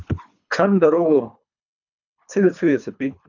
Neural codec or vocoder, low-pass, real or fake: codec, 24 kHz, 3 kbps, HILCodec; 7.2 kHz; fake